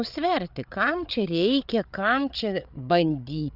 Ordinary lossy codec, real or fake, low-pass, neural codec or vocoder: Opus, 64 kbps; fake; 5.4 kHz; codec, 16 kHz, 8 kbps, FreqCodec, larger model